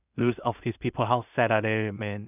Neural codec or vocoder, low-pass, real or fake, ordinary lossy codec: codec, 16 kHz in and 24 kHz out, 0.4 kbps, LongCat-Audio-Codec, two codebook decoder; 3.6 kHz; fake; none